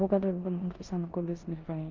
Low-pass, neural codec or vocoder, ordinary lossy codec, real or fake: 7.2 kHz; codec, 16 kHz in and 24 kHz out, 0.9 kbps, LongCat-Audio-Codec, four codebook decoder; Opus, 32 kbps; fake